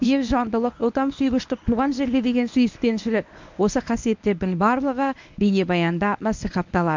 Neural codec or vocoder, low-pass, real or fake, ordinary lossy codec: codec, 24 kHz, 0.9 kbps, WavTokenizer, medium speech release version 1; 7.2 kHz; fake; MP3, 64 kbps